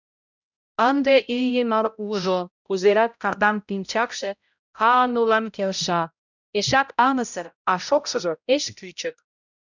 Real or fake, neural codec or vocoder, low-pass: fake; codec, 16 kHz, 0.5 kbps, X-Codec, HuBERT features, trained on balanced general audio; 7.2 kHz